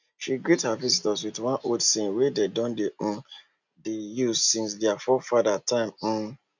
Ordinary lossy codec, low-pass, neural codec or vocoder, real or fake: none; 7.2 kHz; none; real